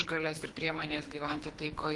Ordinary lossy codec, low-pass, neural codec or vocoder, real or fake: Opus, 24 kbps; 10.8 kHz; codec, 24 kHz, 3 kbps, HILCodec; fake